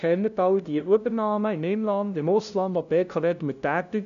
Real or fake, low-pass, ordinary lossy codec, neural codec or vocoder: fake; 7.2 kHz; none; codec, 16 kHz, 0.5 kbps, FunCodec, trained on LibriTTS, 25 frames a second